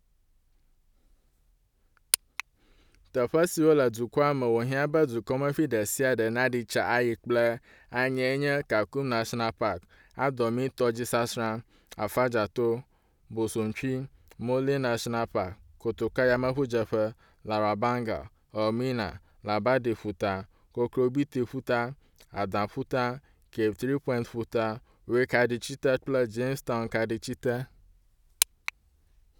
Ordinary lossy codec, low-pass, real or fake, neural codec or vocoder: none; 19.8 kHz; real; none